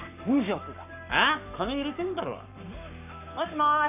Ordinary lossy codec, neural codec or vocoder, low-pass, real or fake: none; codec, 44.1 kHz, 7.8 kbps, Pupu-Codec; 3.6 kHz; fake